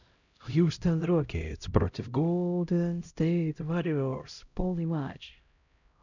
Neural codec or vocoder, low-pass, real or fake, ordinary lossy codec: codec, 16 kHz, 0.5 kbps, X-Codec, HuBERT features, trained on LibriSpeech; 7.2 kHz; fake; none